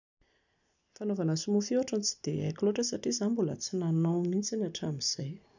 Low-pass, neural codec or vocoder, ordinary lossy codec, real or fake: 7.2 kHz; none; none; real